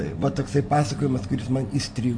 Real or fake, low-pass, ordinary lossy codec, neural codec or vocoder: real; 9.9 kHz; MP3, 48 kbps; none